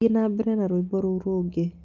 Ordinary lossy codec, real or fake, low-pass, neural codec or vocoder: Opus, 24 kbps; real; 7.2 kHz; none